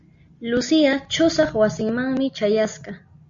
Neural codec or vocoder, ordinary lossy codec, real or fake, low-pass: none; Opus, 64 kbps; real; 7.2 kHz